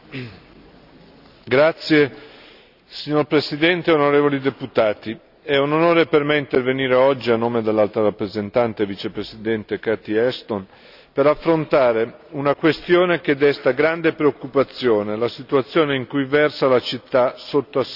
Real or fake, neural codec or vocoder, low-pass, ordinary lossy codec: real; none; 5.4 kHz; none